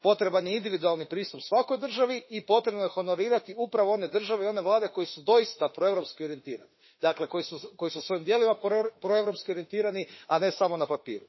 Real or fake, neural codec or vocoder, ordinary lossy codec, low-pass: fake; autoencoder, 48 kHz, 32 numbers a frame, DAC-VAE, trained on Japanese speech; MP3, 24 kbps; 7.2 kHz